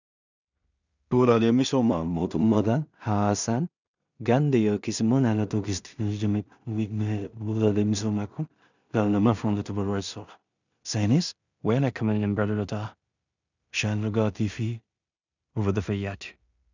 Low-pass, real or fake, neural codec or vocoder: 7.2 kHz; fake; codec, 16 kHz in and 24 kHz out, 0.4 kbps, LongCat-Audio-Codec, two codebook decoder